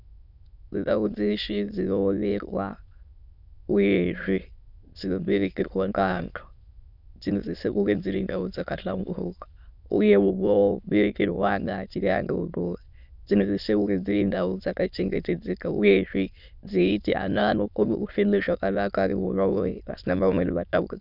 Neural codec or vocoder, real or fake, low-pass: autoencoder, 22.05 kHz, a latent of 192 numbers a frame, VITS, trained on many speakers; fake; 5.4 kHz